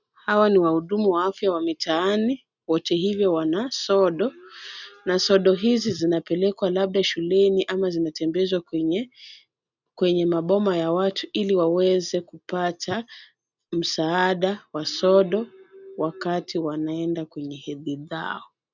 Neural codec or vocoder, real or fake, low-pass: none; real; 7.2 kHz